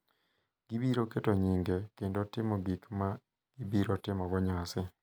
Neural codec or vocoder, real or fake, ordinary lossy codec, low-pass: none; real; none; none